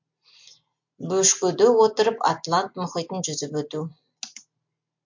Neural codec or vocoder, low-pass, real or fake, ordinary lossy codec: none; 7.2 kHz; real; MP3, 48 kbps